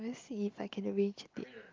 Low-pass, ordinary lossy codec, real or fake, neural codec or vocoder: 7.2 kHz; Opus, 24 kbps; real; none